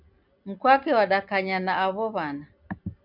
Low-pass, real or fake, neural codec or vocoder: 5.4 kHz; real; none